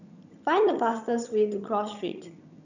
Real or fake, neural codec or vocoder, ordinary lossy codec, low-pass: fake; vocoder, 22.05 kHz, 80 mel bands, HiFi-GAN; none; 7.2 kHz